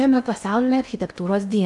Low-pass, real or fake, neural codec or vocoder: 10.8 kHz; fake; codec, 16 kHz in and 24 kHz out, 0.6 kbps, FocalCodec, streaming, 2048 codes